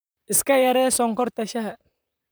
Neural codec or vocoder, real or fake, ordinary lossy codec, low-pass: vocoder, 44.1 kHz, 128 mel bands, Pupu-Vocoder; fake; none; none